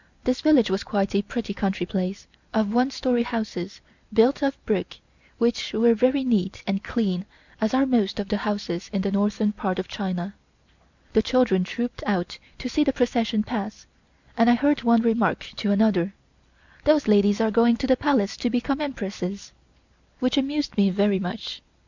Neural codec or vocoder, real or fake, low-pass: none; real; 7.2 kHz